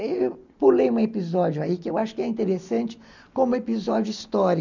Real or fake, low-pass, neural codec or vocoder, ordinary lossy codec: real; 7.2 kHz; none; none